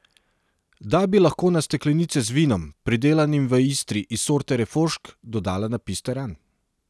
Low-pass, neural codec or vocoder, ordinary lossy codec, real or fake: none; none; none; real